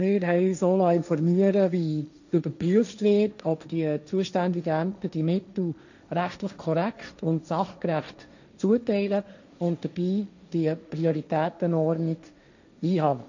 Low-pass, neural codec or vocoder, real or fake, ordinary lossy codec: 7.2 kHz; codec, 16 kHz, 1.1 kbps, Voila-Tokenizer; fake; none